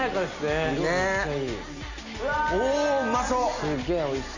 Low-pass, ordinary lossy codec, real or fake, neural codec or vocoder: 7.2 kHz; none; real; none